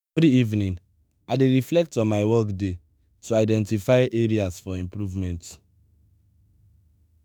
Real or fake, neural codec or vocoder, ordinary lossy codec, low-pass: fake; autoencoder, 48 kHz, 32 numbers a frame, DAC-VAE, trained on Japanese speech; none; none